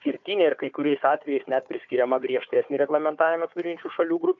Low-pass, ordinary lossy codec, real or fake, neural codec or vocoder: 7.2 kHz; AAC, 64 kbps; fake; codec, 16 kHz, 4 kbps, FunCodec, trained on Chinese and English, 50 frames a second